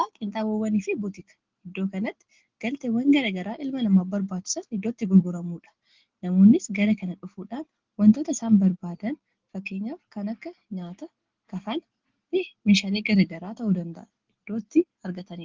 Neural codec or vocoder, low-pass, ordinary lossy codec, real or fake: none; 7.2 kHz; Opus, 16 kbps; real